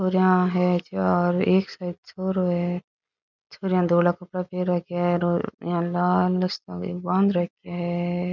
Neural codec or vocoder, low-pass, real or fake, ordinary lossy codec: none; 7.2 kHz; real; none